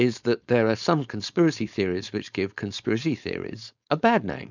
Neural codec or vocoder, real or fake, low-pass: codec, 16 kHz, 4.8 kbps, FACodec; fake; 7.2 kHz